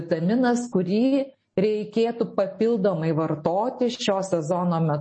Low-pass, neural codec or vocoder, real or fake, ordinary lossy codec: 9.9 kHz; none; real; MP3, 32 kbps